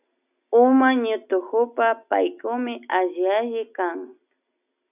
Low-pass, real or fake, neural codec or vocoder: 3.6 kHz; real; none